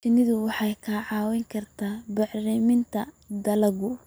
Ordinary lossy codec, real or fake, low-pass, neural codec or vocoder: none; real; none; none